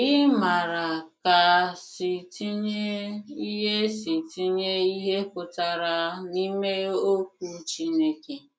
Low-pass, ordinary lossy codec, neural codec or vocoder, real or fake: none; none; none; real